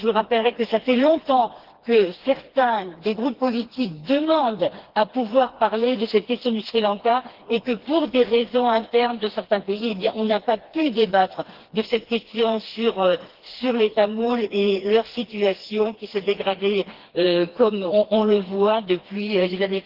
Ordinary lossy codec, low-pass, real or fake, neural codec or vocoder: Opus, 16 kbps; 5.4 kHz; fake; codec, 16 kHz, 2 kbps, FreqCodec, smaller model